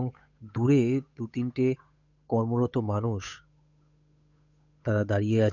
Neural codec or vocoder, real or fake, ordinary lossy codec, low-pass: codec, 16 kHz, 8 kbps, FunCodec, trained on Chinese and English, 25 frames a second; fake; none; 7.2 kHz